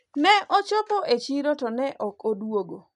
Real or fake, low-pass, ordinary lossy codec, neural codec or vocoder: real; 10.8 kHz; none; none